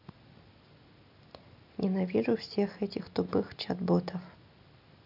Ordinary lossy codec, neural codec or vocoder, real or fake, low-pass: none; none; real; 5.4 kHz